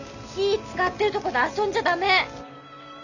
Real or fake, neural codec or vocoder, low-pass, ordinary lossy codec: real; none; 7.2 kHz; none